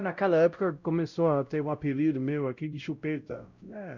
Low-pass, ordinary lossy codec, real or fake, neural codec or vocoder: 7.2 kHz; Opus, 64 kbps; fake; codec, 16 kHz, 0.5 kbps, X-Codec, WavLM features, trained on Multilingual LibriSpeech